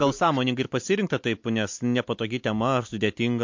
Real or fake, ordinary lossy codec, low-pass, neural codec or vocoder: real; MP3, 48 kbps; 7.2 kHz; none